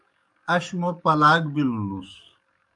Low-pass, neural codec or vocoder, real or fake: 10.8 kHz; codec, 44.1 kHz, 7.8 kbps, DAC; fake